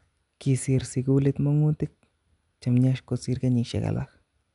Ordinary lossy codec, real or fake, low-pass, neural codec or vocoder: none; real; 10.8 kHz; none